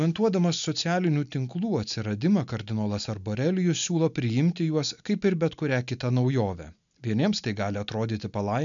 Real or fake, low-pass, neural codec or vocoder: real; 7.2 kHz; none